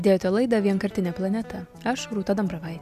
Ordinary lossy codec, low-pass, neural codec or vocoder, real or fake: AAC, 96 kbps; 14.4 kHz; none; real